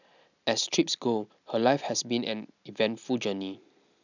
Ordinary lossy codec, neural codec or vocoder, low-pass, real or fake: none; none; 7.2 kHz; real